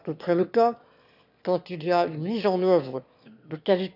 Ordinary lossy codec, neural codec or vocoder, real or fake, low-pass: none; autoencoder, 22.05 kHz, a latent of 192 numbers a frame, VITS, trained on one speaker; fake; 5.4 kHz